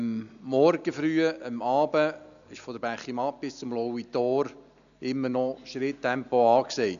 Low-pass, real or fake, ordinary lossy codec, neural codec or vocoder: 7.2 kHz; real; none; none